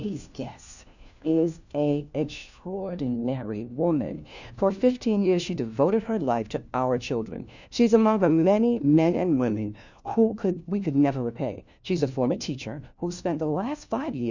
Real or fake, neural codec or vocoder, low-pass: fake; codec, 16 kHz, 1 kbps, FunCodec, trained on LibriTTS, 50 frames a second; 7.2 kHz